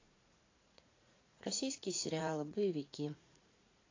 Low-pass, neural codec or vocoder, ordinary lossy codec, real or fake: 7.2 kHz; vocoder, 44.1 kHz, 80 mel bands, Vocos; AAC, 32 kbps; fake